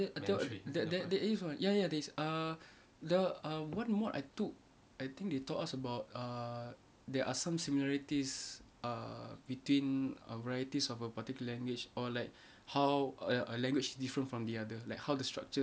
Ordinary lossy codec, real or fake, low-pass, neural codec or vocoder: none; real; none; none